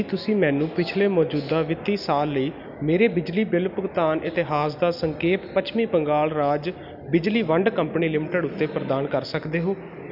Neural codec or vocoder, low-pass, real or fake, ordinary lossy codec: none; 5.4 kHz; real; none